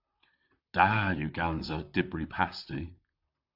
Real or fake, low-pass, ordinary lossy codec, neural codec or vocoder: fake; 5.4 kHz; AAC, 48 kbps; vocoder, 44.1 kHz, 128 mel bands, Pupu-Vocoder